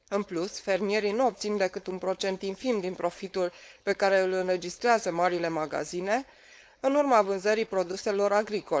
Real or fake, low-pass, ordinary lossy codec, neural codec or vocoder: fake; none; none; codec, 16 kHz, 4.8 kbps, FACodec